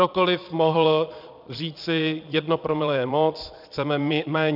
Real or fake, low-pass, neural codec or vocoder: fake; 5.4 kHz; vocoder, 22.05 kHz, 80 mel bands, Vocos